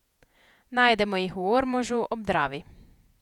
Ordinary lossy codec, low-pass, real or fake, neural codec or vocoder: none; 19.8 kHz; fake; vocoder, 48 kHz, 128 mel bands, Vocos